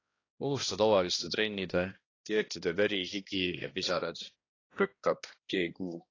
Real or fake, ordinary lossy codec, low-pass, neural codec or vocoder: fake; AAC, 32 kbps; 7.2 kHz; codec, 16 kHz, 1 kbps, X-Codec, HuBERT features, trained on balanced general audio